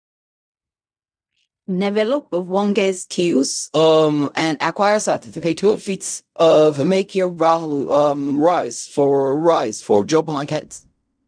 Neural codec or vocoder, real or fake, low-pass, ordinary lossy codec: codec, 16 kHz in and 24 kHz out, 0.4 kbps, LongCat-Audio-Codec, fine tuned four codebook decoder; fake; 9.9 kHz; none